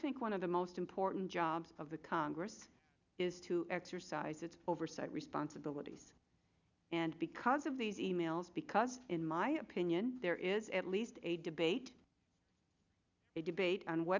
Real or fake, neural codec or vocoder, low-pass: real; none; 7.2 kHz